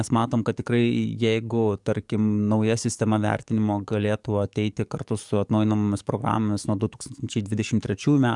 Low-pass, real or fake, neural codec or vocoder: 10.8 kHz; fake; codec, 44.1 kHz, 7.8 kbps, DAC